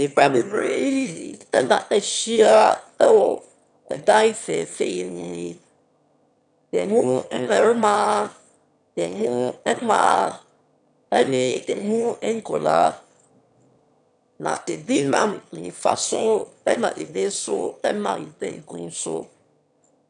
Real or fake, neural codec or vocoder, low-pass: fake; autoencoder, 22.05 kHz, a latent of 192 numbers a frame, VITS, trained on one speaker; 9.9 kHz